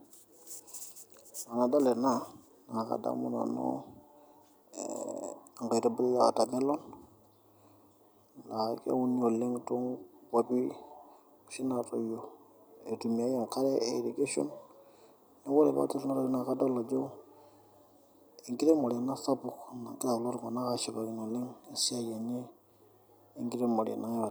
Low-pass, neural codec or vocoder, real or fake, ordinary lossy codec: none; none; real; none